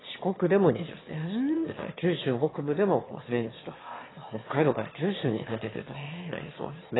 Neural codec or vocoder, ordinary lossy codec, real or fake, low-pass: autoencoder, 22.05 kHz, a latent of 192 numbers a frame, VITS, trained on one speaker; AAC, 16 kbps; fake; 7.2 kHz